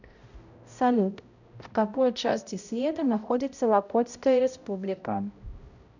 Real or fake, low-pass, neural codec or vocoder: fake; 7.2 kHz; codec, 16 kHz, 0.5 kbps, X-Codec, HuBERT features, trained on balanced general audio